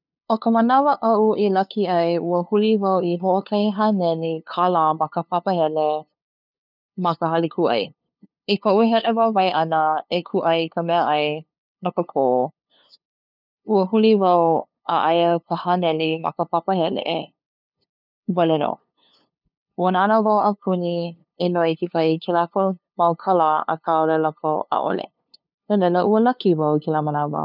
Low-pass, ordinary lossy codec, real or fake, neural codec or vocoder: 5.4 kHz; none; fake; codec, 16 kHz, 2 kbps, FunCodec, trained on LibriTTS, 25 frames a second